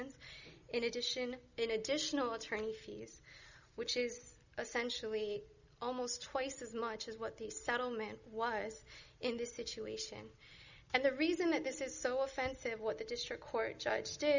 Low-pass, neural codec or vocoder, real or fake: 7.2 kHz; none; real